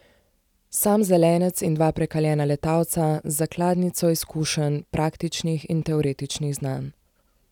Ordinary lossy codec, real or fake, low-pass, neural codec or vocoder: none; real; 19.8 kHz; none